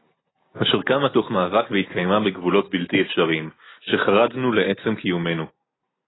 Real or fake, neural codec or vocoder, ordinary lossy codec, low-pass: real; none; AAC, 16 kbps; 7.2 kHz